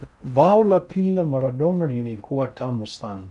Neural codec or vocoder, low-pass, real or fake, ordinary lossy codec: codec, 16 kHz in and 24 kHz out, 0.6 kbps, FocalCodec, streaming, 4096 codes; 10.8 kHz; fake; MP3, 96 kbps